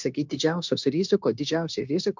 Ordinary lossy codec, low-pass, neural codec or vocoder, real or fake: MP3, 64 kbps; 7.2 kHz; codec, 16 kHz, 0.9 kbps, LongCat-Audio-Codec; fake